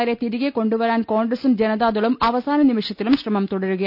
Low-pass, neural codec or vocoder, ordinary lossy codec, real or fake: 5.4 kHz; none; none; real